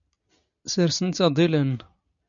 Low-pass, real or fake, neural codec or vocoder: 7.2 kHz; real; none